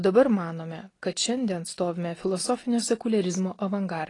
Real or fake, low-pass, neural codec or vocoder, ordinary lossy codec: real; 10.8 kHz; none; AAC, 32 kbps